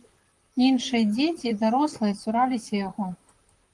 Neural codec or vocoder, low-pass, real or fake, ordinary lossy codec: vocoder, 44.1 kHz, 128 mel bands, Pupu-Vocoder; 10.8 kHz; fake; Opus, 24 kbps